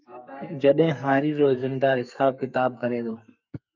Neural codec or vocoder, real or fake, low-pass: codec, 44.1 kHz, 2.6 kbps, SNAC; fake; 7.2 kHz